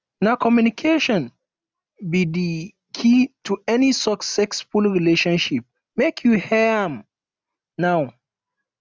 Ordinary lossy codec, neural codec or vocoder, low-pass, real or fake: none; none; none; real